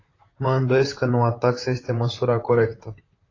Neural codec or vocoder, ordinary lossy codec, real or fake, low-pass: vocoder, 44.1 kHz, 128 mel bands, Pupu-Vocoder; AAC, 32 kbps; fake; 7.2 kHz